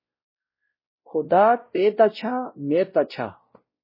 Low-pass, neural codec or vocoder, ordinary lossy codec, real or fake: 5.4 kHz; codec, 16 kHz, 0.5 kbps, X-Codec, WavLM features, trained on Multilingual LibriSpeech; MP3, 24 kbps; fake